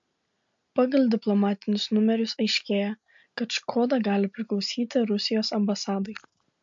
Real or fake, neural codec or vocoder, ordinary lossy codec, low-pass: real; none; MP3, 48 kbps; 7.2 kHz